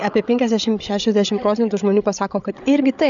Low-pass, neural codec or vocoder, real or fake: 7.2 kHz; codec, 16 kHz, 8 kbps, FreqCodec, larger model; fake